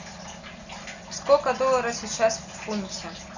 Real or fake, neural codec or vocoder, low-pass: real; none; 7.2 kHz